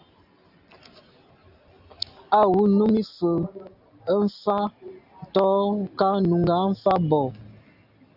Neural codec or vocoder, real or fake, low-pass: none; real; 5.4 kHz